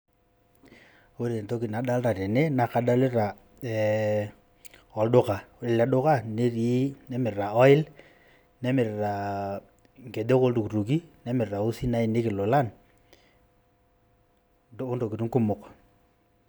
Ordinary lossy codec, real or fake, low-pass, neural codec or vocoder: none; real; none; none